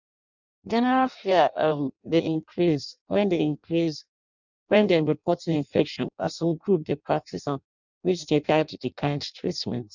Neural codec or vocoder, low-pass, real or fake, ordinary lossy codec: codec, 16 kHz in and 24 kHz out, 0.6 kbps, FireRedTTS-2 codec; 7.2 kHz; fake; none